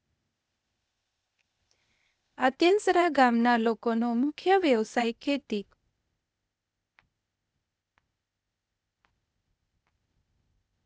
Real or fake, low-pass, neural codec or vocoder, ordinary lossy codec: fake; none; codec, 16 kHz, 0.8 kbps, ZipCodec; none